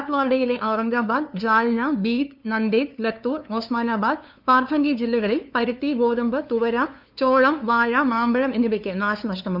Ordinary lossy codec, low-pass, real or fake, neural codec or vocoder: none; 5.4 kHz; fake; codec, 16 kHz, 2 kbps, FunCodec, trained on LibriTTS, 25 frames a second